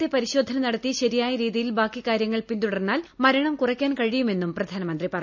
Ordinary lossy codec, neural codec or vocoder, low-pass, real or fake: none; none; 7.2 kHz; real